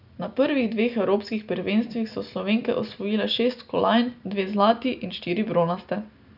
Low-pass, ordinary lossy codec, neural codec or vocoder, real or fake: 5.4 kHz; none; none; real